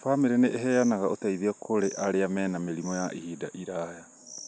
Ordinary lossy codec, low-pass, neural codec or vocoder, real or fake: none; none; none; real